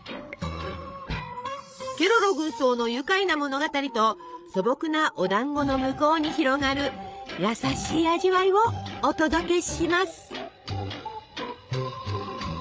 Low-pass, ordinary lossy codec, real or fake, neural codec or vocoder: none; none; fake; codec, 16 kHz, 8 kbps, FreqCodec, larger model